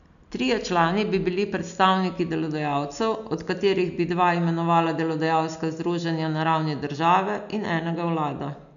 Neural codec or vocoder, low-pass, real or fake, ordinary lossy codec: none; 7.2 kHz; real; none